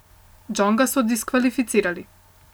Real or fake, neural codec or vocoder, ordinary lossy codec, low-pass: real; none; none; none